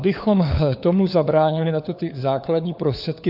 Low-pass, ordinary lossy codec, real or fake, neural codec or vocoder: 5.4 kHz; AAC, 48 kbps; fake; codec, 16 kHz, 4 kbps, FunCodec, trained on LibriTTS, 50 frames a second